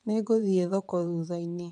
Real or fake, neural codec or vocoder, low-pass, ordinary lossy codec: fake; vocoder, 22.05 kHz, 80 mel bands, WaveNeXt; 9.9 kHz; none